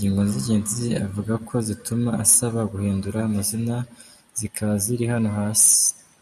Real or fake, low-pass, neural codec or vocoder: real; 14.4 kHz; none